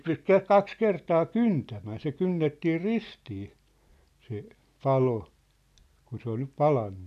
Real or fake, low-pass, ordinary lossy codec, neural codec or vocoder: real; 14.4 kHz; none; none